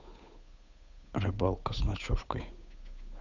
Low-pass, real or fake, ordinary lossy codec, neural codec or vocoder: 7.2 kHz; fake; none; codec, 16 kHz, 8 kbps, FunCodec, trained on Chinese and English, 25 frames a second